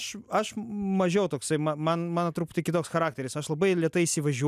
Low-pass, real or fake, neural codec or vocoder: 14.4 kHz; real; none